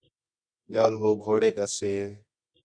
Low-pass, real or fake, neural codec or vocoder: 9.9 kHz; fake; codec, 24 kHz, 0.9 kbps, WavTokenizer, medium music audio release